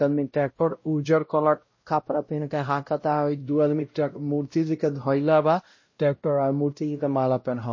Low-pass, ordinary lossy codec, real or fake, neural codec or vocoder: 7.2 kHz; MP3, 32 kbps; fake; codec, 16 kHz, 0.5 kbps, X-Codec, WavLM features, trained on Multilingual LibriSpeech